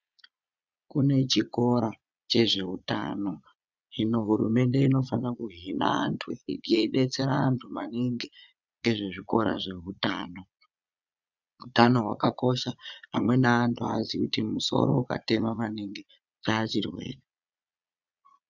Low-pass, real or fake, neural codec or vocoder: 7.2 kHz; fake; vocoder, 22.05 kHz, 80 mel bands, Vocos